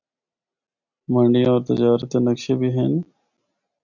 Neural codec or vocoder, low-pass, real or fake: none; 7.2 kHz; real